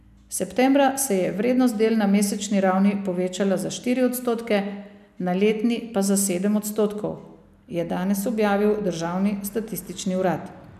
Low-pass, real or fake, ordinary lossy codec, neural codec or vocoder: 14.4 kHz; real; none; none